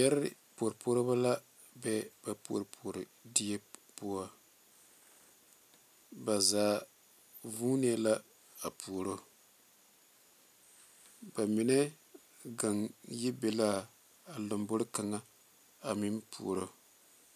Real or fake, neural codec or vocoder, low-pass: real; none; 14.4 kHz